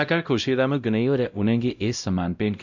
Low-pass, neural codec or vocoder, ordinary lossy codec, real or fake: 7.2 kHz; codec, 16 kHz, 0.5 kbps, X-Codec, WavLM features, trained on Multilingual LibriSpeech; none; fake